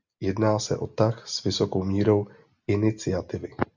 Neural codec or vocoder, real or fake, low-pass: none; real; 7.2 kHz